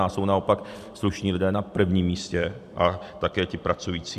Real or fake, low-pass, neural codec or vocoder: fake; 14.4 kHz; vocoder, 44.1 kHz, 128 mel bands every 256 samples, BigVGAN v2